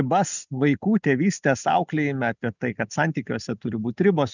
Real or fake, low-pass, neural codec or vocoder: real; 7.2 kHz; none